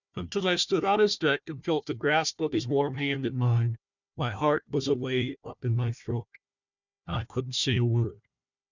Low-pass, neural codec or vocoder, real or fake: 7.2 kHz; codec, 16 kHz, 1 kbps, FunCodec, trained on Chinese and English, 50 frames a second; fake